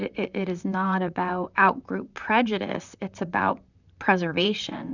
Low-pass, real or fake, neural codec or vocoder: 7.2 kHz; fake; vocoder, 44.1 kHz, 128 mel bands, Pupu-Vocoder